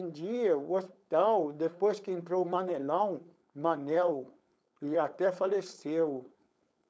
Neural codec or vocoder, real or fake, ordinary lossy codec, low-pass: codec, 16 kHz, 4.8 kbps, FACodec; fake; none; none